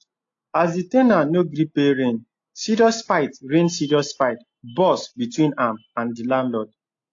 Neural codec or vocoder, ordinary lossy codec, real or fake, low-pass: none; AAC, 48 kbps; real; 7.2 kHz